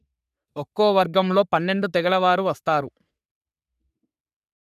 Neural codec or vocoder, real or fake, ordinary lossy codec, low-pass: codec, 44.1 kHz, 3.4 kbps, Pupu-Codec; fake; none; 14.4 kHz